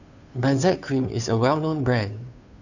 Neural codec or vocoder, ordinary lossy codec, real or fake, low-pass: codec, 16 kHz, 2 kbps, FunCodec, trained on Chinese and English, 25 frames a second; none; fake; 7.2 kHz